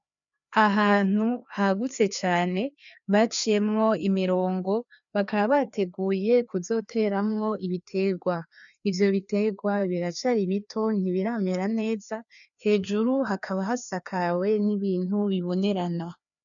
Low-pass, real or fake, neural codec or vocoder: 7.2 kHz; fake; codec, 16 kHz, 2 kbps, FreqCodec, larger model